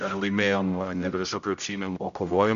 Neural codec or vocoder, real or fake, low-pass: codec, 16 kHz, 0.5 kbps, X-Codec, HuBERT features, trained on general audio; fake; 7.2 kHz